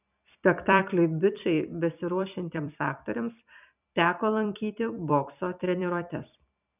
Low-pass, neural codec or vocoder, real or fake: 3.6 kHz; vocoder, 44.1 kHz, 128 mel bands every 256 samples, BigVGAN v2; fake